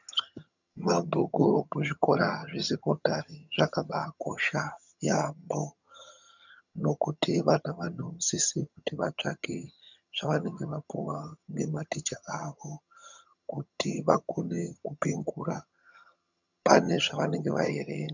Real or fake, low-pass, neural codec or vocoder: fake; 7.2 kHz; vocoder, 22.05 kHz, 80 mel bands, HiFi-GAN